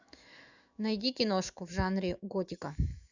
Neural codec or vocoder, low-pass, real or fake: autoencoder, 48 kHz, 128 numbers a frame, DAC-VAE, trained on Japanese speech; 7.2 kHz; fake